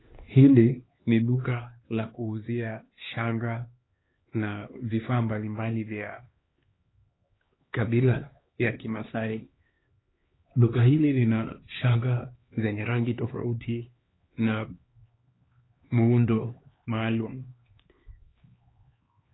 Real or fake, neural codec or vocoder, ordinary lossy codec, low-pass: fake; codec, 16 kHz, 2 kbps, X-Codec, HuBERT features, trained on LibriSpeech; AAC, 16 kbps; 7.2 kHz